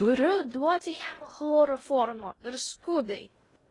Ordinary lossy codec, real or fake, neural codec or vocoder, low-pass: AAC, 32 kbps; fake; codec, 16 kHz in and 24 kHz out, 0.6 kbps, FocalCodec, streaming, 2048 codes; 10.8 kHz